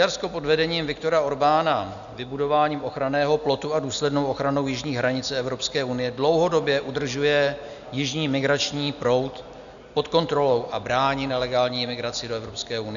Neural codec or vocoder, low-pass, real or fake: none; 7.2 kHz; real